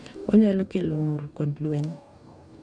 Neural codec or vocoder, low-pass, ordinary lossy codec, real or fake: codec, 44.1 kHz, 2.6 kbps, DAC; 9.9 kHz; none; fake